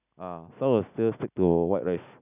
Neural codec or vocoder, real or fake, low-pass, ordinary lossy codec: none; real; 3.6 kHz; none